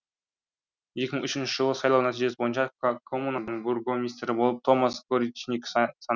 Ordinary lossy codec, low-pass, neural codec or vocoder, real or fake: none; 7.2 kHz; none; real